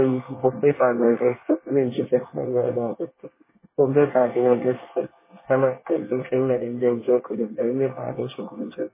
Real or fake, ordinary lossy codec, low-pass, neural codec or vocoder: fake; MP3, 16 kbps; 3.6 kHz; codec, 24 kHz, 1 kbps, SNAC